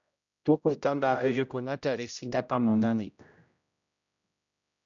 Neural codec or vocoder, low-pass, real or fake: codec, 16 kHz, 0.5 kbps, X-Codec, HuBERT features, trained on general audio; 7.2 kHz; fake